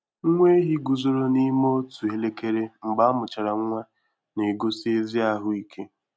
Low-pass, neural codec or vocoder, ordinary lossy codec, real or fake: none; none; none; real